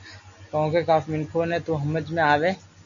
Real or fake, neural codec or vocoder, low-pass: real; none; 7.2 kHz